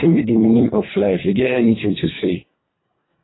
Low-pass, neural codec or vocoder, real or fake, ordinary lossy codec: 7.2 kHz; codec, 24 kHz, 1.5 kbps, HILCodec; fake; AAC, 16 kbps